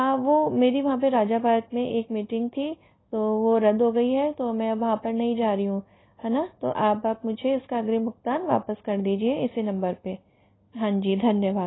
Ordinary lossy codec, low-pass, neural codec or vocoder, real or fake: AAC, 16 kbps; 7.2 kHz; none; real